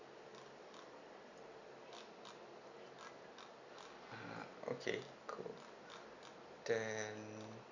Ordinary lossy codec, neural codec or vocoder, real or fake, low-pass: Opus, 64 kbps; none; real; 7.2 kHz